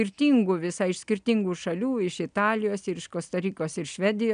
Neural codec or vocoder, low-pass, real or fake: none; 9.9 kHz; real